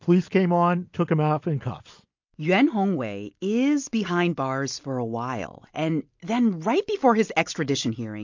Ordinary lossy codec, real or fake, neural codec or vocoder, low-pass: MP3, 48 kbps; real; none; 7.2 kHz